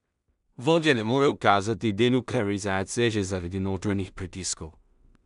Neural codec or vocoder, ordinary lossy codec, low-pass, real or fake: codec, 16 kHz in and 24 kHz out, 0.4 kbps, LongCat-Audio-Codec, two codebook decoder; none; 10.8 kHz; fake